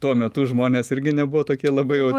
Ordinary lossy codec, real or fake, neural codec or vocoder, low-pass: Opus, 32 kbps; fake; autoencoder, 48 kHz, 128 numbers a frame, DAC-VAE, trained on Japanese speech; 14.4 kHz